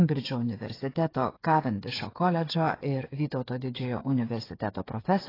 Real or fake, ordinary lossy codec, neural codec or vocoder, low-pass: fake; AAC, 24 kbps; codec, 16 kHz, 8 kbps, FreqCodec, smaller model; 5.4 kHz